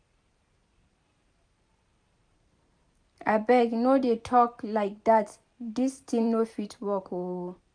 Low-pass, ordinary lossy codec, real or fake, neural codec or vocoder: 9.9 kHz; none; fake; vocoder, 44.1 kHz, 128 mel bands every 512 samples, BigVGAN v2